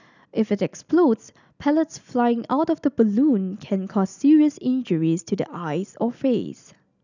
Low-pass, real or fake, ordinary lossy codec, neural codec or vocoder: 7.2 kHz; real; none; none